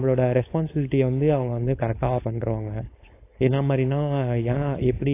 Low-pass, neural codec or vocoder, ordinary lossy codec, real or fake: 3.6 kHz; codec, 16 kHz, 4.8 kbps, FACodec; AAC, 24 kbps; fake